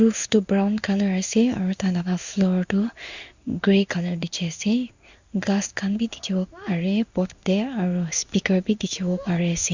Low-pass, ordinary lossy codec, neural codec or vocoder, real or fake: 7.2 kHz; Opus, 64 kbps; codec, 16 kHz in and 24 kHz out, 1 kbps, XY-Tokenizer; fake